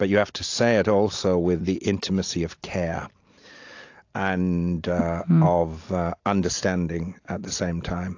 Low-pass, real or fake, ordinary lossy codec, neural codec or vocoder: 7.2 kHz; real; AAC, 48 kbps; none